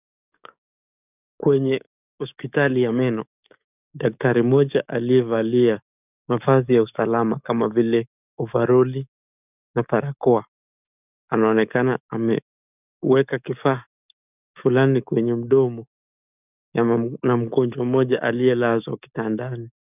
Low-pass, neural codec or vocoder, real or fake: 3.6 kHz; codec, 44.1 kHz, 7.8 kbps, DAC; fake